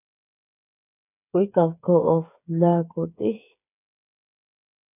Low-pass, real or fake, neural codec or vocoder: 3.6 kHz; fake; codec, 16 kHz, 8 kbps, FreqCodec, smaller model